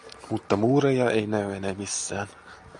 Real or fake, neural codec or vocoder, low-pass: real; none; 10.8 kHz